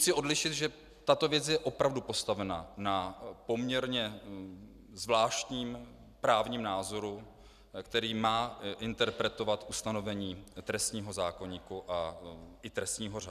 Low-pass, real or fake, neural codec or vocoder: 14.4 kHz; real; none